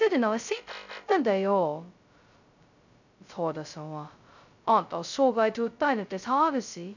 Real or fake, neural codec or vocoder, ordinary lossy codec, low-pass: fake; codec, 16 kHz, 0.2 kbps, FocalCodec; none; 7.2 kHz